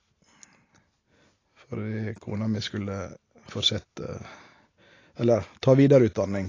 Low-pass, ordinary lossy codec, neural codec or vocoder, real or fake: 7.2 kHz; AAC, 32 kbps; none; real